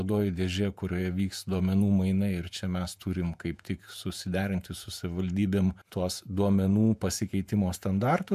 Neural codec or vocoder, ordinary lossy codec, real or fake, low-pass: vocoder, 48 kHz, 128 mel bands, Vocos; MP3, 96 kbps; fake; 14.4 kHz